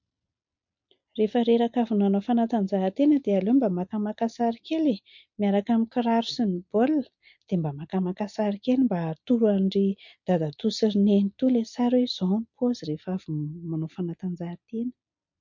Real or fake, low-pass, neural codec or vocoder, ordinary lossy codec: real; 7.2 kHz; none; MP3, 48 kbps